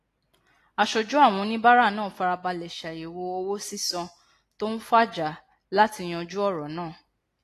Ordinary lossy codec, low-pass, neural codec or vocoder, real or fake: AAC, 48 kbps; 14.4 kHz; none; real